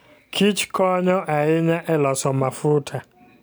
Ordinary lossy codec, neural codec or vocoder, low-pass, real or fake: none; none; none; real